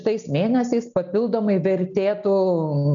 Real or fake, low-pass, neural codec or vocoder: real; 7.2 kHz; none